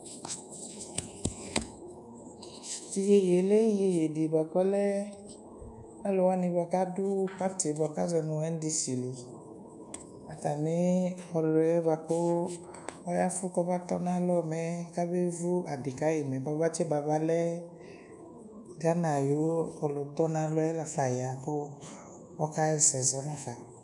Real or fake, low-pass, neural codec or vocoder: fake; 10.8 kHz; codec, 24 kHz, 1.2 kbps, DualCodec